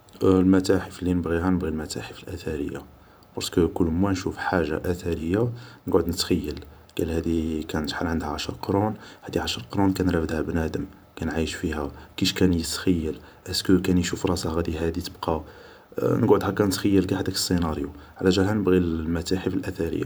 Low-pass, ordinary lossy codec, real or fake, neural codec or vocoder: none; none; fake; vocoder, 44.1 kHz, 128 mel bands every 512 samples, BigVGAN v2